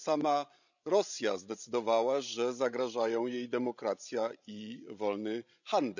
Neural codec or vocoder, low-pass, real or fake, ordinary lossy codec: codec, 16 kHz, 16 kbps, FreqCodec, larger model; 7.2 kHz; fake; none